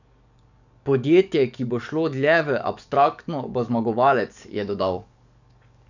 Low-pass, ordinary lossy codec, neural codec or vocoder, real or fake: 7.2 kHz; none; codec, 44.1 kHz, 7.8 kbps, DAC; fake